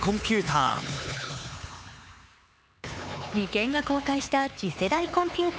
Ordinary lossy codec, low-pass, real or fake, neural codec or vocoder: none; none; fake; codec, 16 kHz, 4 kbps, X-Codec, HuBERT features, trained on LibriSpeech